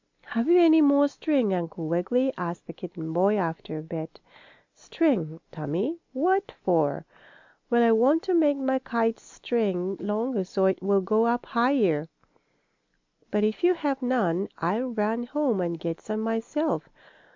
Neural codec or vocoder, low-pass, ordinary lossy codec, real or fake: none; 7.2 kHz; MP3, 64 kbps; real